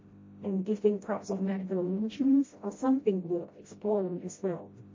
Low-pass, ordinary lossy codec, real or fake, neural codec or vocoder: 7.2 kHz; MP3, 32 kbps; fake; codec, 16 kHz, 0.5 kbps, FreqCodec, smaller model